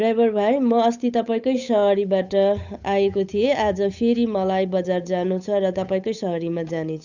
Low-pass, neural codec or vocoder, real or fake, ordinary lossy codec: 7.2 kHz; codec, 16 kHz, 8 kbps, FunCodec, trained on Chinese and English, 25 frames a second; fake; none